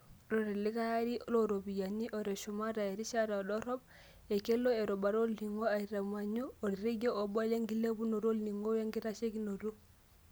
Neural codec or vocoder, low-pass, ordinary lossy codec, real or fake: none; none; none; real